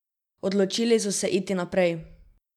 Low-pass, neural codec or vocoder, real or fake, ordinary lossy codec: 19.8 kHz; none; real; none